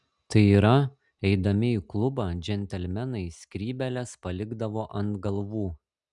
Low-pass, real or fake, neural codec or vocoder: 10.8 kHz; real; none